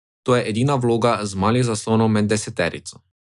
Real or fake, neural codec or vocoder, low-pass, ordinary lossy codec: real; none; 10.8 kHz; none